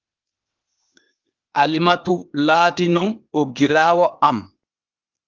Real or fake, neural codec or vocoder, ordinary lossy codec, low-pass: fake; codec, 16 kHz, 0.8 kbps, ZipCodec; Opus, 24 kbps; 7.2 kHz